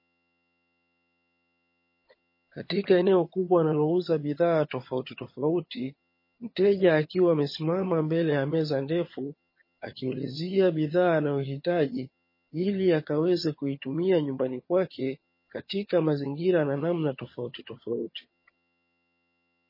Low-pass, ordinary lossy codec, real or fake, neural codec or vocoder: 5.4 kHz; MP3, 24 kbps; fake; vocoder, 22.05 kHz, 80 mel bands, HiFi-GAN